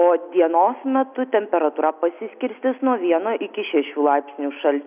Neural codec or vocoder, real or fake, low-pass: none; real; 3.6 kHz